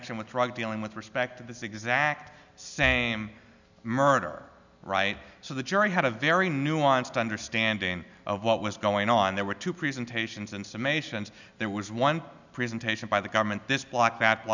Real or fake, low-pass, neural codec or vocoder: real; 7.2 kHz; none